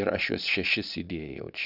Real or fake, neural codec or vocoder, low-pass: fake; vocoder, 22.05 kHz, 80 mel bands, Vocos; 5.4 kHz